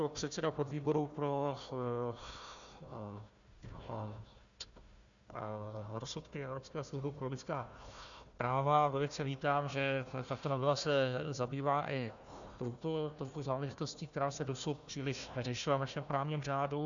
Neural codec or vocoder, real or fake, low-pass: codec, 16 kHz, 1 kbps, FunCodec, trained on Chinese and English, 50 frames a second; fake; 7.2 kHz